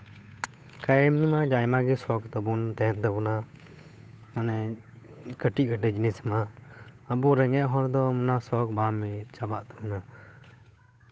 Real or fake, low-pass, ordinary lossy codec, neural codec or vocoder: fake; none; none; codec, 16 kHz, 8 kbps, FunCodec, trained on Chinese and English, 25 frames a second